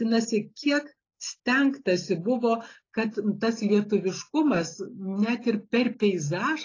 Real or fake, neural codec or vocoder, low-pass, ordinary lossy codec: real; none; 7.2 kHz; AAC, 32 kbps